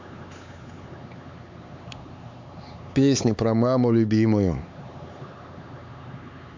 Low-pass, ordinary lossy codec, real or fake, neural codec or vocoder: 7.2 kHz; MP3, 64 kbps; fake; codec, 16 kHz, 4 kbps, X-Codec, HuBERT features, trained on LibriSpeech